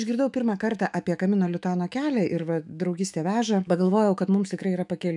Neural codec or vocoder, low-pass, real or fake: autoencoder, 48 kHz, 128 numbers a frame, DAC-VAE, trained on Japanese speech; 10.8 kHz; fake